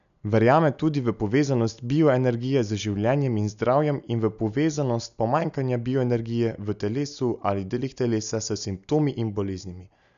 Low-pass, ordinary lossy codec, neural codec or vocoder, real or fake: 7.2 kHz; none; none; real